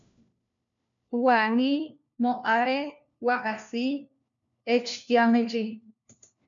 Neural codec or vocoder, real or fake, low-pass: codec, 16 kHz, 1 kbps, FunCodec, trained on LibriTTS, 50 frames a second; fake; 7.2 kHz